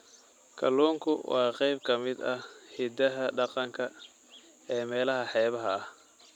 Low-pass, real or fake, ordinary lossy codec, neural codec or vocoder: 19.8 kHz; real; none; none